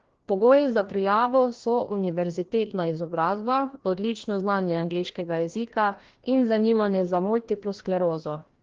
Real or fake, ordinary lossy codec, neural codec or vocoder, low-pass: fake; Opus, 16 kbps; codec, 16 kHz, 1 kbps, FreqCodec, larger model; 7.2 kHz